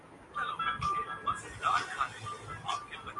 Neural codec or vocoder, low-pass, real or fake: none; 10.8 kHz; real